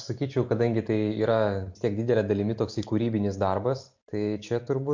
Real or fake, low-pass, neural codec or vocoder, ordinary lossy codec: real; 7.2 kHz; none; MP3, 48 kbps